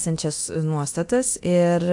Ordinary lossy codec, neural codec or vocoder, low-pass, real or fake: AAC, 64 kbps; codec, 24 kHz, 0.9 kbps, DualCodec; 10.8 kHz; fake